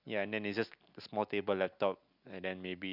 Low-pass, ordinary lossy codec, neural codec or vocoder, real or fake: 5.4 kHz; none; none; real